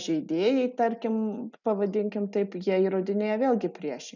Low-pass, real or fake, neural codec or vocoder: 7.2 kHz; real; none